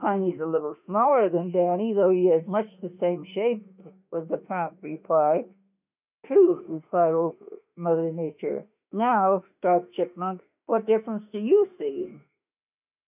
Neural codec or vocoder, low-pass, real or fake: autoencoder, 48 kHz, 32 numbers a frame, DAC-VAE, trained on Japanese speech; 3.6 kHz; fake